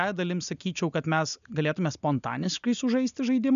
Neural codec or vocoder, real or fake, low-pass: none; real; 7.2 kHz